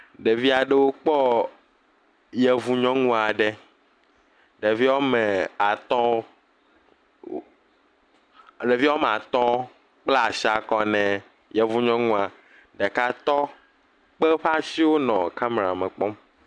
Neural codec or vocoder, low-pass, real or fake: none; 9.9 kHz; real